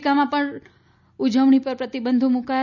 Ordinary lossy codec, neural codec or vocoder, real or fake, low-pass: none; none; real; 7.2 kHz